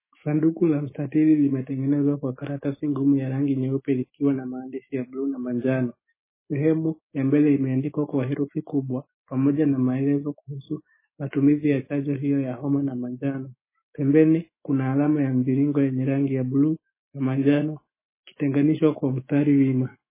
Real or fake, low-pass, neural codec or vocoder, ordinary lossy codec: fake; 3.6 kHz; autoencoder, 48 kHz, 128 numbers a frame, DAC-VAE, trained on Japanese speech; MP3, 16 kbps